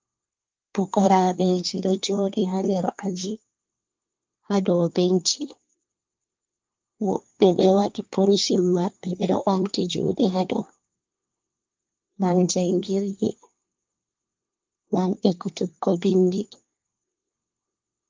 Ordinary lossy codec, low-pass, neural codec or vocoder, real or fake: Opus, 24 kbps; 7.2 kHz; codec, 24 kHz, 1 kbps, SNAC; fake